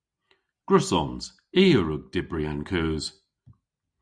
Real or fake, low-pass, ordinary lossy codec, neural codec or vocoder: real; 9.9 kHz; Opus, 64 kbps; none